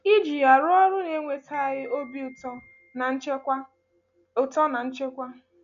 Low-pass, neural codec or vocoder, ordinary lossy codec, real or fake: 7.2 kHz; none; none; real